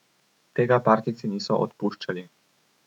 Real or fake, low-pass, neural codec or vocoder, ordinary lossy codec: fake; 19.8 kHz; autoencoder, 48 kHz, 128 numbers a frame, DAC-VAE, trained on Japanese speech; none